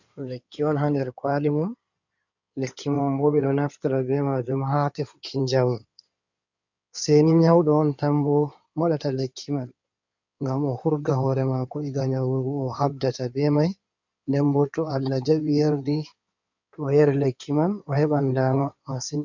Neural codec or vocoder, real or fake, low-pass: codec, 16 kHz in and 24 kHz out, 2.2 kbps, FireRedTTS-2 codec; fake; 7.2 kHz